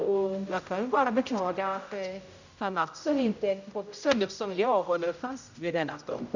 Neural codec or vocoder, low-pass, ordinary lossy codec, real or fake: codec, 16 kHz, 0.5 kbps, X-Codec, HuBERT features, trained on general audio; 7.2 kHz; none; fake